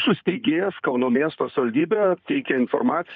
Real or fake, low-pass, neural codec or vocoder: fake; 7.2 kHz; codec, 16 kHz in and 24 kHz out, 2.2 kbps, FireRedTTS-2 codec